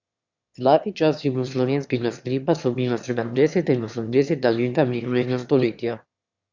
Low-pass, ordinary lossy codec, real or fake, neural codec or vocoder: 7.2 kHz; Opus, 64 kbps; fake; autoencoder, 22.05 kHz, a latent of 192 numbers a frame, VITS, trained on one speaker